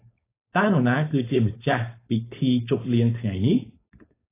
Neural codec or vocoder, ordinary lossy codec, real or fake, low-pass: codec, 16 kHz, 4.8 kbps, FACodec; AAC, 16 kbps; fake; 3.6 kHz